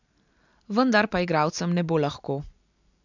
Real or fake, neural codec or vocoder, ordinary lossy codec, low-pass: real; none; none; 7.2 kHz